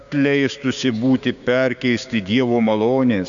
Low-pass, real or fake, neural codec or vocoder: 7.2 kHz; fake; codec, 16 kHz, 6 kbps, DAC